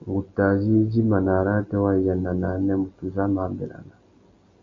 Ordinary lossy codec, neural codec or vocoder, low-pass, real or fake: MP3, 48 kbps; none; 7.2 kHz; real